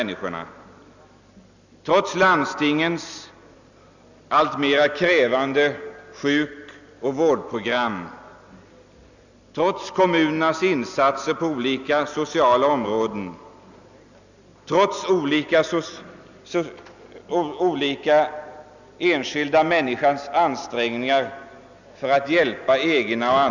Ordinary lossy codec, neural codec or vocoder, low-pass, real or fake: none; none; 7.2 kHz; real